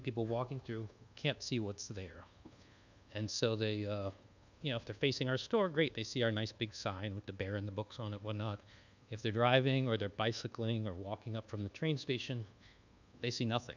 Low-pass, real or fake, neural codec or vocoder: 7.2 kHz; fake; codec, 24 kHz, 1.2 kbps, DualCodec